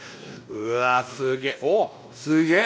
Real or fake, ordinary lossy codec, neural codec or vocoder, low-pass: fake; none; codec, 16 kHz, 1 kbps, X-Codec, WavLM features, trained on Multilingual LibriSpeech; none